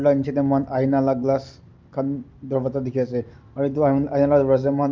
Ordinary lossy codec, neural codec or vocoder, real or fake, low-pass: Opus, 24 kbps; none; real; 7.2 kHz